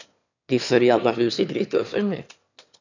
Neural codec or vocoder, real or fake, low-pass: autoencoder, 22.05 kHz, a latent of 192 numbers a frame, VITS, trained on one speaker; fake; 7.2 kHz